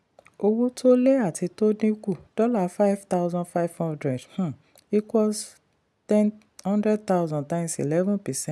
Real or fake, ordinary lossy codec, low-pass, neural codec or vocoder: real; none; none; none